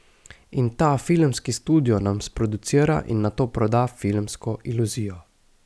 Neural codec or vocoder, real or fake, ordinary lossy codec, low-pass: none; real; none; none